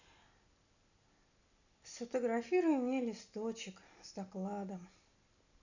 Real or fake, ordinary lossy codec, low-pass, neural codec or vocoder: real; none; 7.2 kHz; none